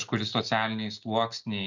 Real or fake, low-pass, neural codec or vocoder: real; 7.2 kHz; none